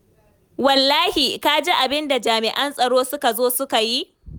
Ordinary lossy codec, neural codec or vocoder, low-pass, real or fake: none; none; none; real